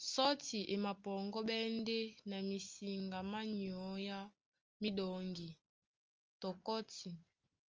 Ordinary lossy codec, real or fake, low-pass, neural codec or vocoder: Opus, 24 kbps; real; 7.2 kHz; none